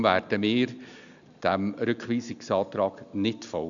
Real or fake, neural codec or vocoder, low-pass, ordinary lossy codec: real; none; 7.2 kHz; none